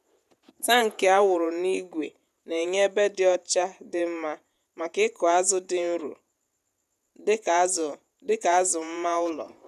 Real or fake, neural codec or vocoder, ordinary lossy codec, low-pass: fake; vocoder, 48 kHz, 128 mel bands, Vocos; none; 14.4 kHz